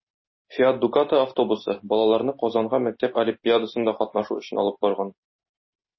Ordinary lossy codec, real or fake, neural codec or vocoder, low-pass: MP3, 24 kbps; real; none; 7.2 kHz